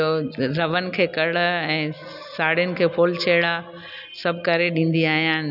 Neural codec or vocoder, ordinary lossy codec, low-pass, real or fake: none; none; 5.4 kHz; real